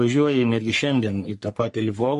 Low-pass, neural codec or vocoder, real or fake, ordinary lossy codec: 14.4 kHz; codec, 44.1 kHz, 3.4 kbps, Pupu-Codec; fake; MP3, 48 kbps